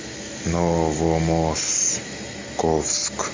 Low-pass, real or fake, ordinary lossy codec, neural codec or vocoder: 7.2 kHz; real; MP3, 48 kbps; none